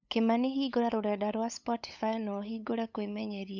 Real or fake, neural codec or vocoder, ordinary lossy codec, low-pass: fake; codec, 16 kHz, 8 kbps, FunCodec, trained on LibriTTS, 25 frames a second; none; 7.2 kHz